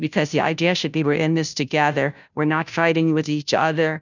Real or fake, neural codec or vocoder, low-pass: fake; codec, 16 kHz, 0.5 kbps, FunCodec, trained on Chinese and English, 25 frames a second; 7.2 kHz